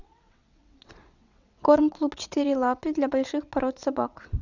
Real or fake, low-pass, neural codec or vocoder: fake; 7.2 kHz; vocoder, 22.05 kHz, 80 mel bands, WaveNeXt